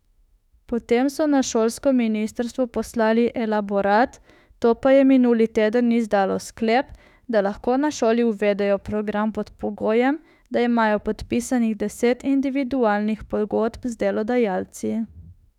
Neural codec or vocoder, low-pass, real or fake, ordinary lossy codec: autoencoder, 48 kHz, 32 numbers a frame, DAC-VAE, trained on Japanese speech; 19.8 kHz; fake; none